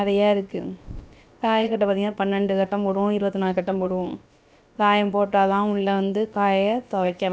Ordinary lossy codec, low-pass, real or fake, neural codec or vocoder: none; none; fake; codec, 16 kHz, about 1 kbps, DyCAST, with the encoder's durations